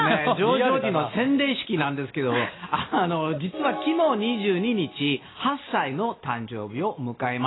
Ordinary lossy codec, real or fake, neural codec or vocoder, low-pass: AAC, 16 kbps; real; none; 7.2 kHz